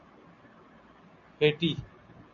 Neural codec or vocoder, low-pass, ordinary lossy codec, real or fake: none; 7.2 kHz; MP3, 48 kbps; real